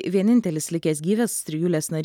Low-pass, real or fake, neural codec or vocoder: 19.8 kHz; real; none